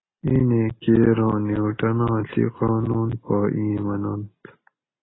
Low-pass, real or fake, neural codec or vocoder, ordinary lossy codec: 7.2 kHz; real; none; AAC, 16 kbps